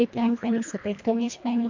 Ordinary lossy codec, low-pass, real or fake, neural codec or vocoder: MP3, 48 kbps; 7.2 kHz; fake; codec, 24 kHz, 1.5 kbps, HILCodec